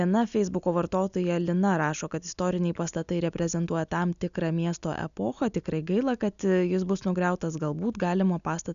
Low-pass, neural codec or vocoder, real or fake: 7.2 kHz; none; real